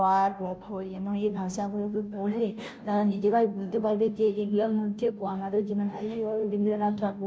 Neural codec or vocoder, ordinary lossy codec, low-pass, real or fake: codec, 16 kHz, 0.5 kbps, FunCodec, trained on Chinese and English, 25 frames a second; none; none; fake